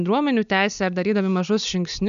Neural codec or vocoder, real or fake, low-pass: none; real; 7.2 kHz